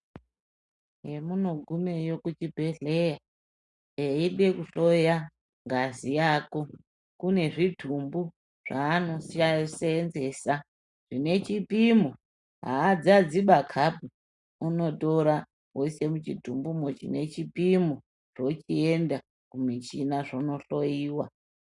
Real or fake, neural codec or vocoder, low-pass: real; none; 10.8 kHz